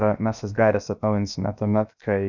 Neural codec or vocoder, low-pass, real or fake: codec, 16 kHz, 0.7 kbps, FocalCodec; 7.2 kHz; fake